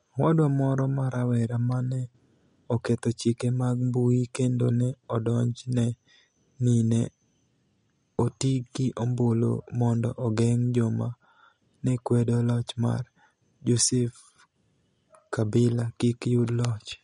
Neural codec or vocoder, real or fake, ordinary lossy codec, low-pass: none; real; MP3, 48 kbps; 10.8 kHz